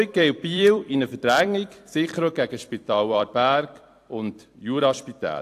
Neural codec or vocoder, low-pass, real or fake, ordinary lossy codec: vocoder, 44.1 kHz, 128 mel bands every 256 samples, BigVGAN v2; 14.4 kHz; fake; AAC, 64 kbps